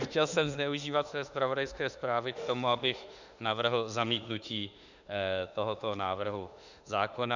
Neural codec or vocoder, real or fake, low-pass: autoencoder, 48 kHz, 32 numbers a frame, DAC-VAE, trained on Japanese speech; fake; 7.2 kHz